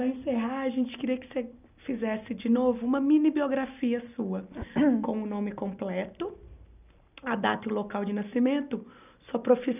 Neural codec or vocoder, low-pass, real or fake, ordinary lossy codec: none; 3.6 kHz; real; none